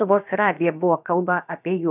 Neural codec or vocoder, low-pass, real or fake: codec, 16 kHz, about 1 kbps, DyCAST, with the encoder's durations; 3.6 kHz; fake